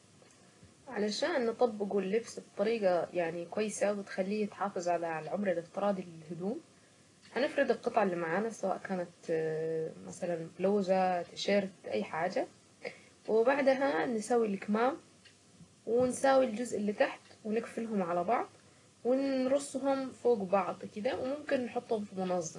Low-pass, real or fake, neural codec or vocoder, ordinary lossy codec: 10.8 kHz; real; none; AAC, 32 kbps